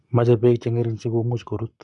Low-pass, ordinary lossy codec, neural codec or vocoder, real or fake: 10.8 kHz; none; codec, 44.1 kHz, 7.8 kbps, Pupu-Codec; fake